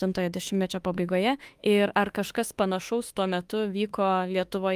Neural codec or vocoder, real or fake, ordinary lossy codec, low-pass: autoencoder, 48 kHz, 32 numbers a frame, DAC-VAE, trained on Japanese speech; fake; Opus, 32 kbps; 14.4 kHz